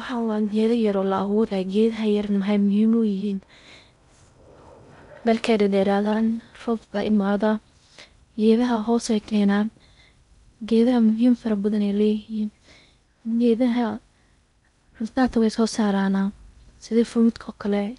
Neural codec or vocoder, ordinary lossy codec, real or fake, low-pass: codec, 16 kHz in and 24 kHz out, 0.6 kbps, FocalCodec, streaming, 2048 codes; none; fake; 10.8 kHz